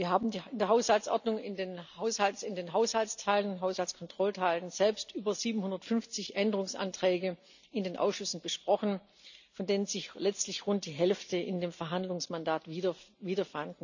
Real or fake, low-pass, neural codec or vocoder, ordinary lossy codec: real; 7.2 kHz; none; none